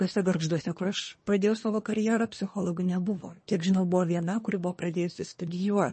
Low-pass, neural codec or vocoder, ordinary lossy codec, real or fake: 10.8 kHz; codec, 24 kHz, 1 kbps, SNAC; MP3, 32 kbps; fake